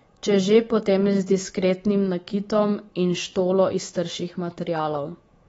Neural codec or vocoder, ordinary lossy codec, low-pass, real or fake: codec, 24 kHz, 3.1 kbps, DualCodec; AAC, 24 kbps; 10.8 kHz; fake